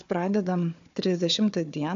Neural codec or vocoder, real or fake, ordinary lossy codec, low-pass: codec, 16 kHz, 4 kbps, FunCodec, trained on Chinese and English, 50 frames a second; fake; AAC, 64 kbps; 7.2 kHz